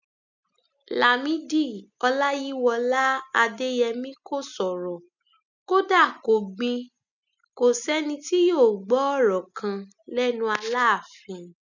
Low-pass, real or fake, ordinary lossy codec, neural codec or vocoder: 7.2 kHz; real; none; none